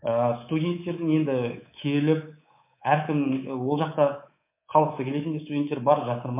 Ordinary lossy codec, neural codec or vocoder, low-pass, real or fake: MP3, 24 kbps; codec, 24 kHz, 3.1 kbps, DualCodec; 3.6 kHz; fake